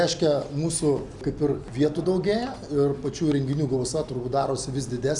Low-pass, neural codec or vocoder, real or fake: 10.8 kHz; none; real